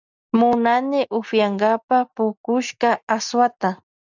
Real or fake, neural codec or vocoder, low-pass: real; none; 7.2 kHz